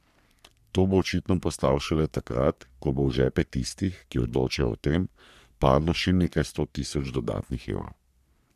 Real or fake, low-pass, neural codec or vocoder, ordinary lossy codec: fake; 14.4 kHz; codec, 44.1 kHz, 3.4 kbps, Pupu-Codec; none